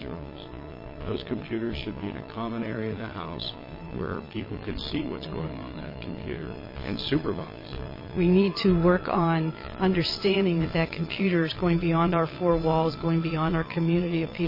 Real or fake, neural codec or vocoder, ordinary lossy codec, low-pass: fake; vocoder, 22.05 kHz, 80 mel bands, Vocos; MP3, 24 kbps; 5.4 kHz